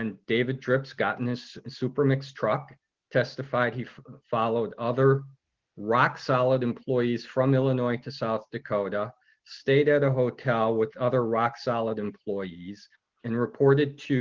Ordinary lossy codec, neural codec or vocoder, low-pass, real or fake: Opus, 16 kbps; none; 7.2 kHz; real